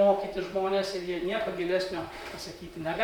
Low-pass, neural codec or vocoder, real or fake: 19.8 kHz; vocoder, 44.1 kHz, 128 mel bands, Pupu-Vocoder; fake